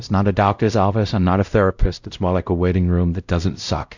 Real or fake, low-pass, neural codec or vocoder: fake; 7.2 kHz; codec, 16 kHz, 0.5 kbps, X-Codec, WavLM features, trained on Multilingual LibriSpeech